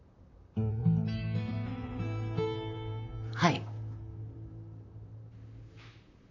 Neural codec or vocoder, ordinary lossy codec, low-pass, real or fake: vocoder, 44.1 kHz, 128 mel bands, Pupu-Vocoder; none; 7.2 kHz; fake